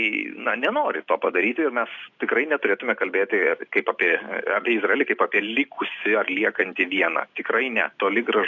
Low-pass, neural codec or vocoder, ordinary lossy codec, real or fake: 7.2 kHz; none; AAC, 48 kbps; real